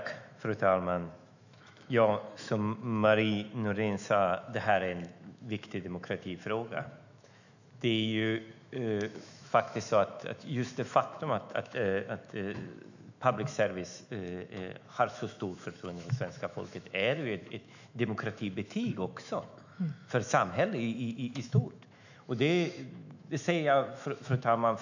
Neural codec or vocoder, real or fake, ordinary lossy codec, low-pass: autoencoder, 48 kHz, 128 numbers a frame, DAC-VAE, trained on Japanese speech; fake; none; 7.2 kHz